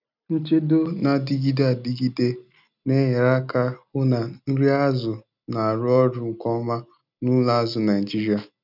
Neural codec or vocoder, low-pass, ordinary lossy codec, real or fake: none; 5.4 kHz; none; real